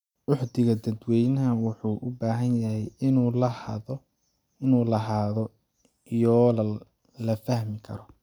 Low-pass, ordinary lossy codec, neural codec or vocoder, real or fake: 19.8 kHz; none; none; real